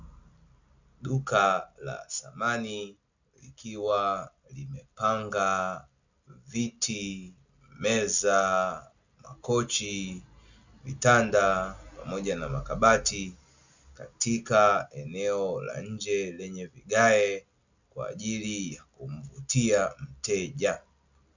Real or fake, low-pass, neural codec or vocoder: real; 7.2 kHz; none